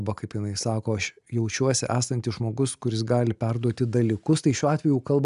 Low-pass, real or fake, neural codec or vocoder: 10.8 kHz; real; none